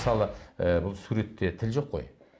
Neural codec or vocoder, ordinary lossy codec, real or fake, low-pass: none; none; real; none